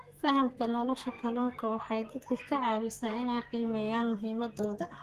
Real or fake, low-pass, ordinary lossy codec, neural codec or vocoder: fake; 14.4 kHz; Opus, 24 kbps; codec, 32 kHz, 1.9 kbps, SNAC